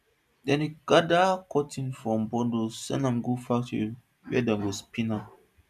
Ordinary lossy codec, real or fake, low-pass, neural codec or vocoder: none; real; 14.4 kHz; none